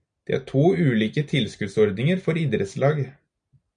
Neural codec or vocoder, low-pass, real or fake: none; 9.9 kHz; real